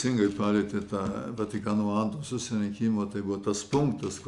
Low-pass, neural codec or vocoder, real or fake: 10.8 kHz; none; real